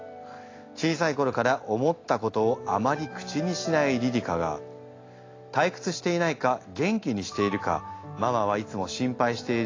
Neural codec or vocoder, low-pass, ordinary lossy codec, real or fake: none; 7.2 kHz; AAC, 32 kbps; real